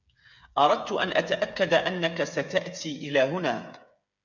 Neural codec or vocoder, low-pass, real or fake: codec, 16 kHz, 8 kbps, FreqCodec, smaller model; 7.2 kHz; fake